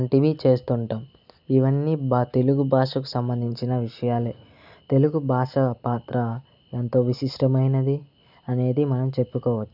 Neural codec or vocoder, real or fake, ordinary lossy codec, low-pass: none; real; none; 5.4 kHz